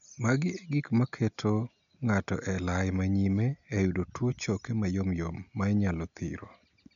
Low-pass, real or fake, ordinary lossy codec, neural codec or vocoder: 7.2 kHz; real; MP3, 96 kbps; none